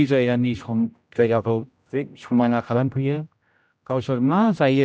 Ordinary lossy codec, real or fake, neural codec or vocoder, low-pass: none; fake; codec, 16 kHz, 0.5 kbps, X-Codec, HuBERT features, trained on general audio; none